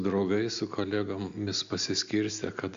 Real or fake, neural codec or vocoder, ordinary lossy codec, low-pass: real; none; AAC, 64 kbps; 7.2 kHz